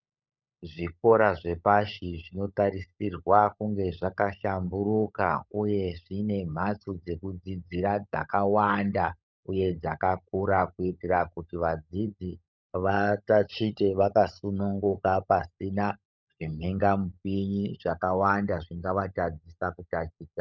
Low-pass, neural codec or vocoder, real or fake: 7.2 kHz; codec, 16 kHz, 16 kbps, FunCodec, trained on LibriTTS, 50 frames a second; fake